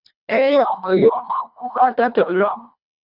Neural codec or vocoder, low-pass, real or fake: codec, 24 kHz, 1.5 kbps, HILCodec; 5.4 kHz; fake